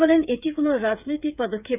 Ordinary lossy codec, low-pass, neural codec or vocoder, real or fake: none; 3.6 kHz; codec, 16 kHz, 8 kbps, FreqCodec, smaller model; fake